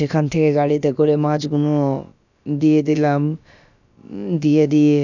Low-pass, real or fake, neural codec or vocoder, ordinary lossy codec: 7.2 kHz; fake; codec, 16 kHz, about 1 kbps, DyCAST, with the encoder's durations; none